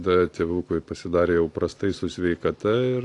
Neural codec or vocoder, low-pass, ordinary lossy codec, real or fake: vocoder, 44.1 kHz, 128 mel bands every 512 samples, BigVGAN v2; 10.8 kHz; AAC, 48 kbps; fake